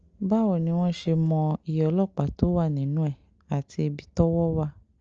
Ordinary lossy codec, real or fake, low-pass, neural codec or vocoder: Opus, 24 kbps; real; 7.2 kHz; none